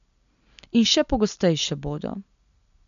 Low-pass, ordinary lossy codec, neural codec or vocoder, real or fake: 7.2 kHz; none; none; real